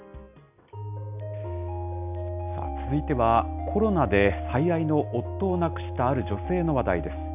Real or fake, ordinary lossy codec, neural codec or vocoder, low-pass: real; none; none; 3.6 kHz